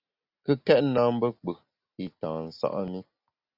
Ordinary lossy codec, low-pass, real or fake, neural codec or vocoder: Opus, 64 kbps; 5.4 kHz; real; none